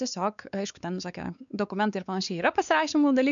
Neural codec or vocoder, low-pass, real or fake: codec, 16 kHz, 4 kbps, X-Codec, WavLM features, trained on Multilingual LibriSpeech; 7.2 kHz; fake